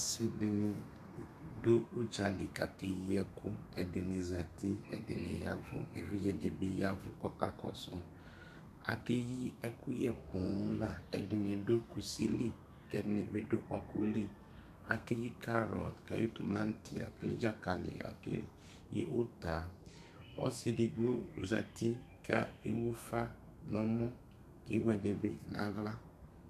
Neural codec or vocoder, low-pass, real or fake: codec, 32 kHz, 1.9 kbps, SNAC; 14.4 kHz; fake